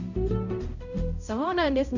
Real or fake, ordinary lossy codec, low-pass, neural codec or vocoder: fake; none; 7.2 kHz; codec, 16 kHz, 0.5 kbps, X-Codec, HuBERT features, trained on balanced general audio